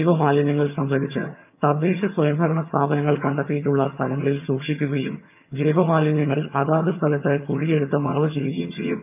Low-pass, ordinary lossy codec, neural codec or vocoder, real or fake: 3.6 kHz; none; vocoder, 22.05 kHz, 80 mel bands, HiFi-GAN; fake